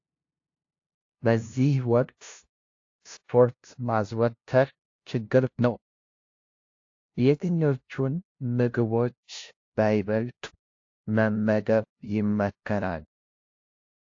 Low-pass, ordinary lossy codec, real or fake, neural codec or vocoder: 7.2 kHz; AAC, 48 kbps; fake; codec, 16 kHz, 0.5 kbps, FunCodec, trained on LibriTTS, 25 frames a second